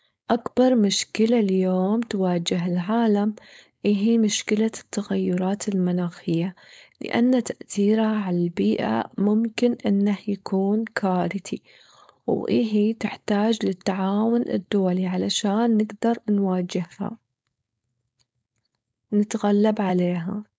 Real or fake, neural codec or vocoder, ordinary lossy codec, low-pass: fake; codec, 16 kHz, 4.8 kbps, FACodec; none; none